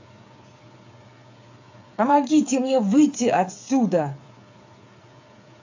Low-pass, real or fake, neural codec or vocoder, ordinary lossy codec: 7.2 kHz; fake; codec, 16 kHz, 8 kbps, FreqCodec, smaller model; AAC, 48 kbps